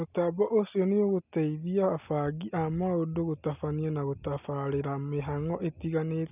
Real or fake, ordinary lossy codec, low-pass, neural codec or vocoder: real; none; 3.6 kHz; none